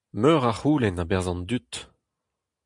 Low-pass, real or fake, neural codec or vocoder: 10.8 kHz; real; none